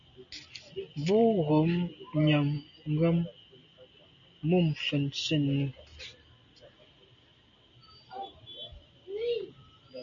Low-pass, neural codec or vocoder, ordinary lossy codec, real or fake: 7.2 kHz; none; MP3, 64 kbps; real